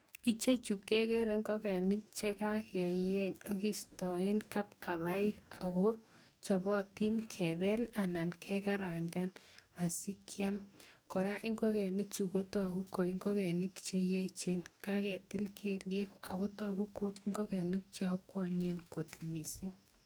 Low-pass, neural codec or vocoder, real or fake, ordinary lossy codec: none; codec, 44.1 kHz, 2.6 kbps, DAC; fake; none